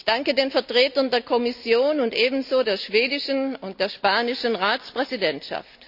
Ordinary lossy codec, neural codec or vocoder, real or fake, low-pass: none; none; real; 5.4 kHz